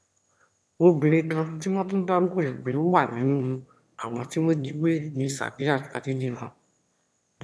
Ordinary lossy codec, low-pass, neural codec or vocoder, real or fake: none; none; autoencoder, 22.05 kHz, a latent of 192 numbers a frame, VITS, trained on one speaker; fake